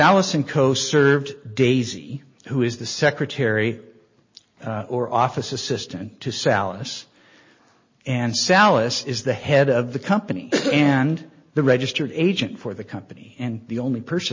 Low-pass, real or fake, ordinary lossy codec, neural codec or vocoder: 7.2 kHz; real; MP3, 32 kbps; none